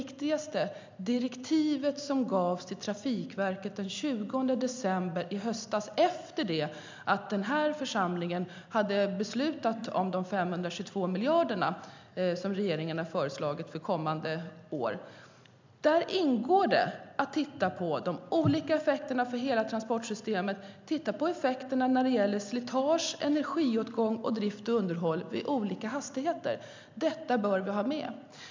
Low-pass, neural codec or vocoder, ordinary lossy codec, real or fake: 7.2 kHz; none; MP3, 64 kbps; real